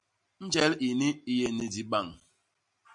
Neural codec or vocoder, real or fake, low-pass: none; real; 10.8 kHz